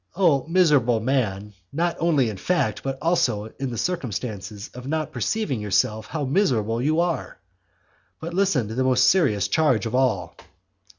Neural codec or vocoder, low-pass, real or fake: none; 7.2 kHz; real